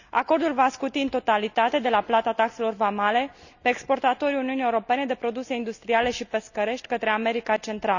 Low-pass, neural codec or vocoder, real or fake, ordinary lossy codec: 7.2 kHz; none; real; none